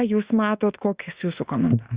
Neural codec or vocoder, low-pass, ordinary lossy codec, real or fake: autoencoder, 48 kHz, 32 numbers a frame, DAC-VAE, trained on Japanese speech; 3.6 kHz; Opus, 64 kbps; fake